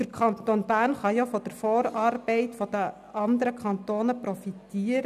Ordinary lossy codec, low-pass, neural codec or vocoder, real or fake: none; 14.4 kHz; none; real